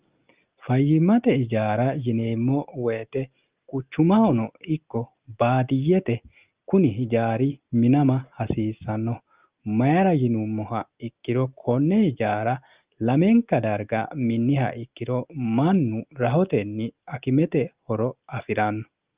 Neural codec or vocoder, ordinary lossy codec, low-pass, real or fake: none; Opus, 32 kbps; 3.6 kHz; real